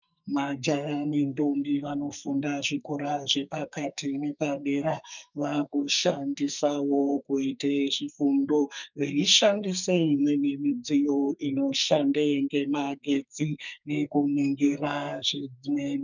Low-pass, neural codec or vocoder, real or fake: 7.2 kHz; codec, 44.1 kHz, 2.6 kbps, SNAC; fake